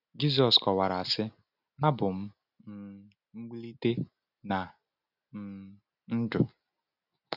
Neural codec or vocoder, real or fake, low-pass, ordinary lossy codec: none; real; 5.4 kHz; none